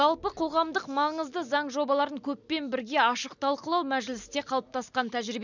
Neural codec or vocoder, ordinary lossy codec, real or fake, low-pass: none; none; real; 7.2 kHz